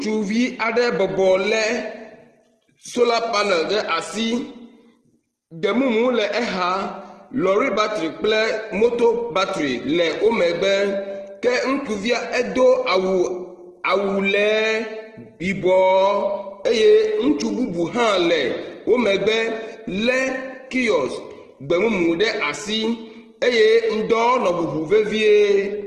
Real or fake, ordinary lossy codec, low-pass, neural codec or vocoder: real; Opus, 16 kbps; 9.9 kHz; none